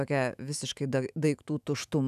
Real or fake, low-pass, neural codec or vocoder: fake; 14.4 kHz; autoencoder, 48 kHz, 128 numbers a frame, DAC-VAE, trained on Japanese speech